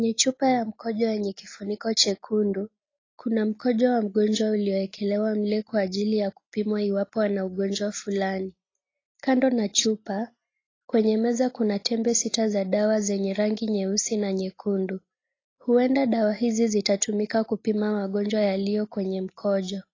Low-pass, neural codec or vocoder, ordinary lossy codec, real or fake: 7.2 kHz; none; AAC, 32 kbps; real